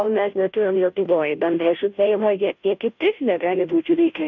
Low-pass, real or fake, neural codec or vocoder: 7.2 kHz; fake; codec, 16 kHz, 0.5 kbps, FunCodec, trained on Chinese and English, 25 frames a second